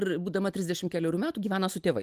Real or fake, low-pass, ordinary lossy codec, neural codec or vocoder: real; 14.4 kHz; Opus, 32 kbps; none